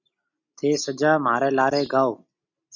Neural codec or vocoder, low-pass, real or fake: none; 7.2 kHz; real